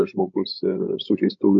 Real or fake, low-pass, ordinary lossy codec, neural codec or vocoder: fake; 7.2 kHz; MP3, 48 kbps; codec, 16 kHz, 16 kbps, FreqCodec, larger model